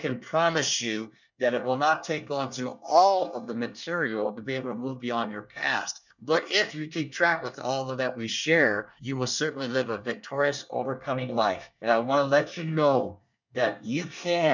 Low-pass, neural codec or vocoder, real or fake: 7.2 kHz; codec, 24 kHz, 1 kbps, SNAC; fake